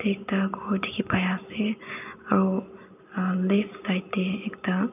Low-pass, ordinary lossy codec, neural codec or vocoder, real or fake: 3.6 kHz; none; none; real